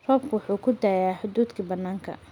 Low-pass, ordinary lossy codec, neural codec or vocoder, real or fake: 19.8 kHz; none; none; real